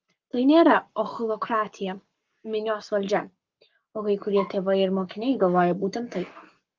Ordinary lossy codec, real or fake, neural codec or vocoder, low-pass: Opus, 24 kbps; fake; codec, 44.1 kHz, 7.8 kbps, Pupu-Codec; 7.2 kHz